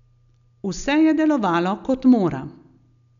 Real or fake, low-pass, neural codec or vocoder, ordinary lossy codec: real; 7.2 kHz; none; none